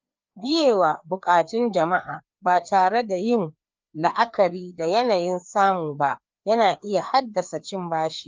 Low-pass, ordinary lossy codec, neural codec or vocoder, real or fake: 7.2 kHz; Opus, 24 kbps; codec, 16 kHz, 2 kbps, FreqCodec, larger model; fake